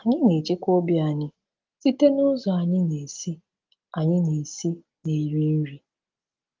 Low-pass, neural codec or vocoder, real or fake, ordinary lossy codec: 7.2 kHz; none; real; Opus, 32 kbps